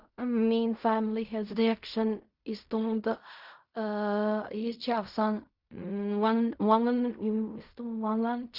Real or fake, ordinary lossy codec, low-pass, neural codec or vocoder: fake; Opus, 64 kbps; 5.4 kHz; codec, 16 kHz in and 24 kHz out, 0.4 kbps, LongCat-Audio-Codec, fine tuned four codebook decoder